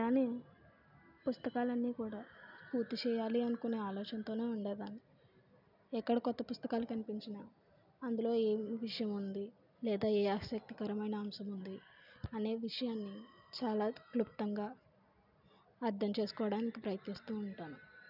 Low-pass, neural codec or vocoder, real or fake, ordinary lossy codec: 5.4 kHz; none; real; none